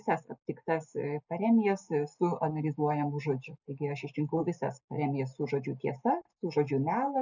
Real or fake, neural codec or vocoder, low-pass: real; none; 7.2 kHz